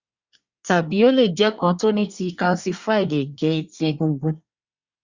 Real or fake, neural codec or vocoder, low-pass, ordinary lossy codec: fake; codec, 24 kHz, 1 kbps, SNAC; 7.2 kHz; Opus, 64 kbps